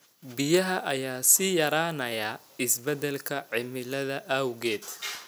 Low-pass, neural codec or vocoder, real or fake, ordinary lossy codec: none; none; real; none